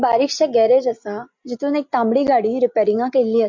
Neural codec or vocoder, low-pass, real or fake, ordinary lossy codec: none; 7.2 kHz; real; MP3, 48 kbps